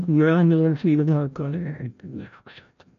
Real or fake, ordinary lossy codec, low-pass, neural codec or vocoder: fake; MP3, 64 kbps; 7.2 kHz; codec, 16 kHz, 0.5 kbps, FreqCodec, larger model